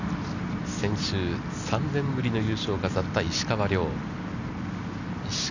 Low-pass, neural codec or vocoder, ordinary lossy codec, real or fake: 7.2 kHz; none; none; real